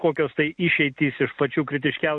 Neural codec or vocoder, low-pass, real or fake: none; 9.9 kHz; real